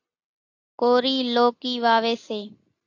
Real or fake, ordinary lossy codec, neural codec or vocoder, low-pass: real; AAC, 48 kbps; none; 7.2 kHz